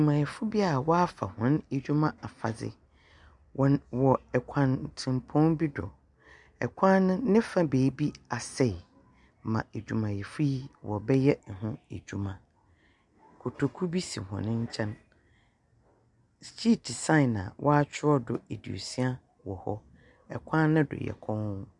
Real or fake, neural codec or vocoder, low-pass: real; none; 10.8 kHz